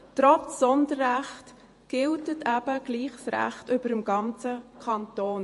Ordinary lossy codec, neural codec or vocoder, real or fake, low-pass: MP3, 48 kbps; vocoder, 48 kHz, 128 mel bands, Vocos; fake; 14.4 kHz